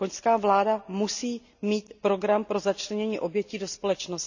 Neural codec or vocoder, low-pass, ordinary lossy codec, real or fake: none; 7.2 kHz; none; real